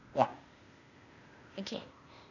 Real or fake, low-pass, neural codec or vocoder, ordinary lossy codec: fake; 7.2 kHz; codec, 16 kHz, 0.8 kbps, ZipCodec; none